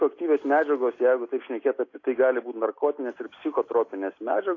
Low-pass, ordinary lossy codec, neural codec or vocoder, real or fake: 7.2 kHz; AAC, 32 kbps; none; real